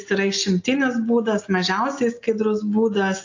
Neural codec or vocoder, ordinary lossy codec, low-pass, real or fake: none; AAC, 48 kbps; 7.2 kHz; real